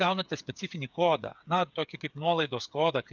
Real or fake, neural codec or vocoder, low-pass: fake; codec, 16 kHz, 8 kbps, FreqCodec, smaller model; 7.2 kHz